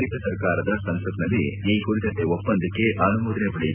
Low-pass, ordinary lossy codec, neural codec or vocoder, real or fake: 3.6 kHz; none; none; real